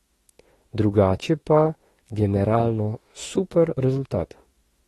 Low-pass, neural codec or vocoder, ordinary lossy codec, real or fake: 19.8 kHz; autoencoder, 48 kHz, 32 numbers a frame, DAC-VAE, trained on Japanese speech; AAC, 32 kbps; fake